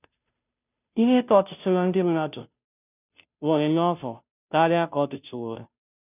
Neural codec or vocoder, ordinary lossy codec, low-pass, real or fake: codec, 16 kHz, 0.5 kbps, FunCodec, trained on Chinese and English, 25 frames a second; none; 3.6 kHz; fake